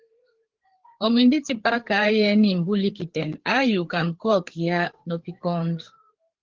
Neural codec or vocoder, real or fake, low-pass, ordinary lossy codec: codec, 16 kHz, 4 kbps, FreqCodec, larger model; fake; 7.2 kHz; Opus, 16 kbps